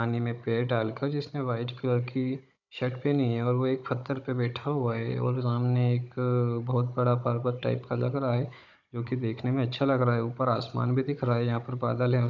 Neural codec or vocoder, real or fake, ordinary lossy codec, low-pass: codec, 16 kHz, 16 kbps, FunCodec, trained on Chinese and English, 50 frames a second; fake; none; 7.2 kHz